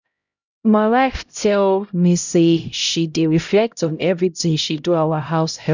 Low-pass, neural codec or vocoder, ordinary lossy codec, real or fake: 7.2 kHz; codec, 16 kHz, 0.5 kbps, X-Codec, HuBERT features, trained on LibriSpeech; none; fake